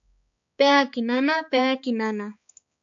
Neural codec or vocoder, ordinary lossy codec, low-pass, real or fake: codec, 16 kHz, 4 kbps, X-Codec, HuBERT features, trained on balanced general audio; AAC, 64 kbps; 7.2 kHz; fake